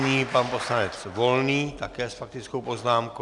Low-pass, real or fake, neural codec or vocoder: 10.8 kHz; real; none